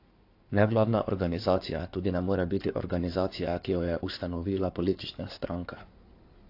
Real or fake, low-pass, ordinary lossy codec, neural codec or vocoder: fake; 5.4 kHz; AAC, 32 kbps; codec, 16 kHz in and 24 kHz out, 2.2 kbps, FireRedTTS-2 codec